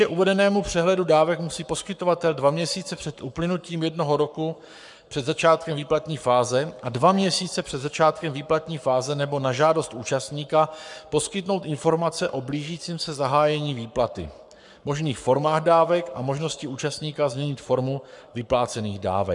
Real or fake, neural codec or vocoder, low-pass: fake; codec, 44.1 kHz, 7.8 kbps, Pupu-Codec; 10.8 kHz